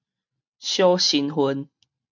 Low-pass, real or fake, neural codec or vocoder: 7.2 kHz; real; none